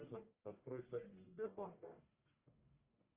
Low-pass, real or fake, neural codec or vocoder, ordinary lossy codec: 3.6 kHz; fake; codec, 44.1 kHz, 1.7 kbps, Pupu-Codec; Opus, 24 kbps